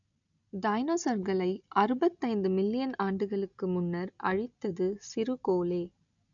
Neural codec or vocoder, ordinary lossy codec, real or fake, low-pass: none; none; real; 7.2 kHz